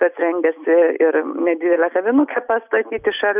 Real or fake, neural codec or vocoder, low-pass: real; none; 3.6 kHz